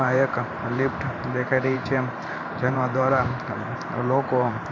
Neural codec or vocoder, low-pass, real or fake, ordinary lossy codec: vocoder, 44.1 kHz, 128 mel bands every 512 samples, BigVGAN v2; 7.2 kHz; fake; AAC, 48 kbps